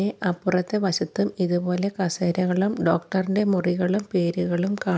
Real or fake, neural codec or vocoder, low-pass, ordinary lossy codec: real; none; none; none